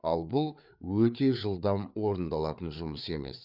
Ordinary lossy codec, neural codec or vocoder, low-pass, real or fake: none; codec, 16 kHz, 4 kbps, FreqCodec, larger model; 5.4 kHz; fake